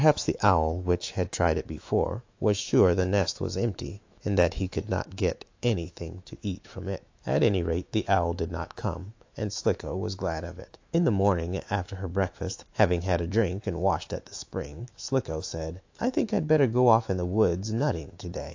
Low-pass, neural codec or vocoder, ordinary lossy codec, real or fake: 7.2 kHz; autoencoder, 48 kHz, 128 numbers a frame, DAC-VAE, trained on Japanese speech; AAC, 48 kbps; fake